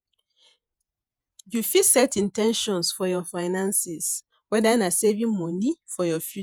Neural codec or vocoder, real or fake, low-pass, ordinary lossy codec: vocoder, 48 kHz, 128 mel bands, Vocos; fake; none; none